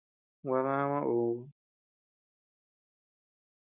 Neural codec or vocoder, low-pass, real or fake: none; 3.6 kHz; real